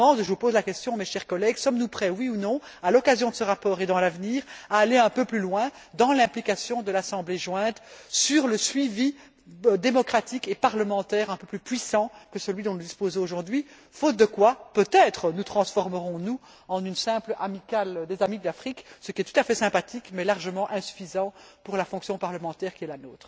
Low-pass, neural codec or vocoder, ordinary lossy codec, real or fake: none; none; none; real